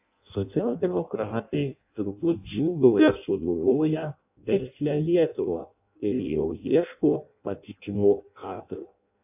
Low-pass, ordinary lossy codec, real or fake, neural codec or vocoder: 3.6 kHz; AAC, 32 kbps; fake; codec, 16 kHz in and 24 kHz out, 0.6 kbps, FireRedTTS-2 codec